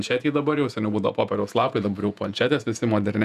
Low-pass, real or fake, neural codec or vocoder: 14.4 kHz; fake; vocoder, 48 kHz, 128 mel bands, Vocos